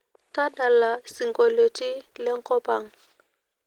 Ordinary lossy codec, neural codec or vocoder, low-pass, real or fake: Opus, 16 kbps; none; 19.8 kHz; real